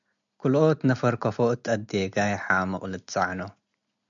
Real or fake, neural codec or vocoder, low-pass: real; none; 7.2 kHz